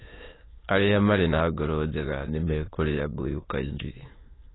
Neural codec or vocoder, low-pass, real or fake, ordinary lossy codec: autoencoder, 22.05 kHz, a latent of 192 numbers a frame, VITS, trained on many speakers; 7.2 kHz; fake; AAC, 16 kbps